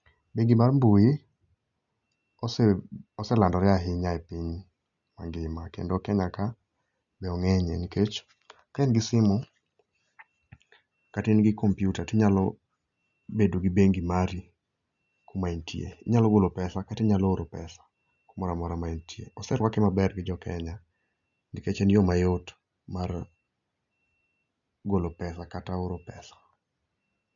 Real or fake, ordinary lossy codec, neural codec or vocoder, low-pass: real; none; none; 7.2 kHz